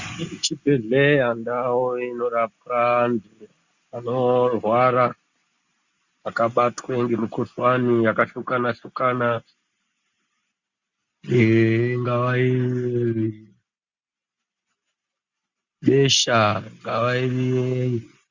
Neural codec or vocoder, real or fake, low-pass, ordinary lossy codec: none; real; 7.2 kHz; Opus, 64 kbps